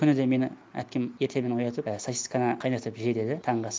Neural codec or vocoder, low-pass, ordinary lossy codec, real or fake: none; none; none; real